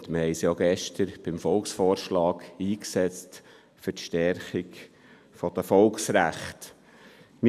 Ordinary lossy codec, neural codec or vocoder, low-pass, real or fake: none; none; 14.4 kHz; real